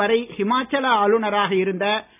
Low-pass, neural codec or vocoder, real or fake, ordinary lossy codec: 3.6 kHz; none; real; none